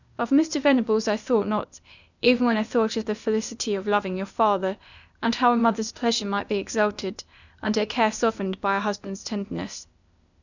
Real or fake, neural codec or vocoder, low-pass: fake; codec, 16 kHz, 0.8 kbps, ZipCodec; 7.2 kHz